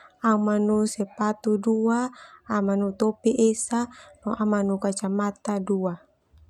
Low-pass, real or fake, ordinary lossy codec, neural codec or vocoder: 19.8 kHz; real; none; none